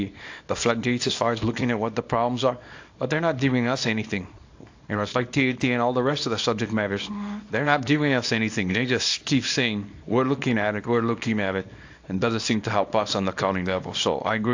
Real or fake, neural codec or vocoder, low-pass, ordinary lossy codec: fake; codec, 24 kHz, 0.9 kbps, WavTokenizer, small release; 7.2 kHz; AAC, 48 kbps